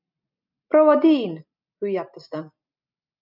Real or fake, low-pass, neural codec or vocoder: real; 5.4 kHz; none